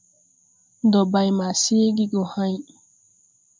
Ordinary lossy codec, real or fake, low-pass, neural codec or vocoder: MP3, 64 kbps; real; 7.2 kHz; none